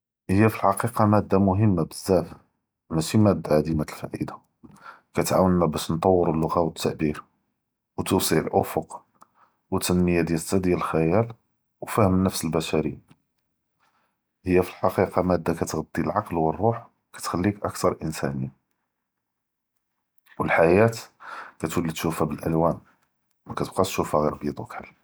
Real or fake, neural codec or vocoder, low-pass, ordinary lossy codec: real; none; none; none